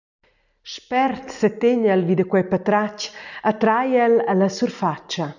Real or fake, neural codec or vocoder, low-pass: real; none; 7.2 kHz